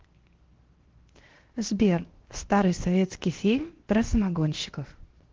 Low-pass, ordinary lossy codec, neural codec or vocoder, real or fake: 7.2 kHz; Opus, 16 kbps; codec, 16 kHz, 0.7 kbps, FocalCodec; fake